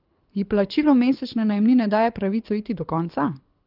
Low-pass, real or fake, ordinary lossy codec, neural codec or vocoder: 5.4 kHz; fake; Opus, 32 kbps; codec, 24 kHz, 6 kbps, HILCodec